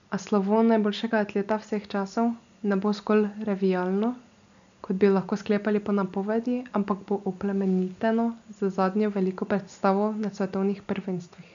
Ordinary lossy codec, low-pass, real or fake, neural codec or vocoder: none; 7.2 kHz; real; none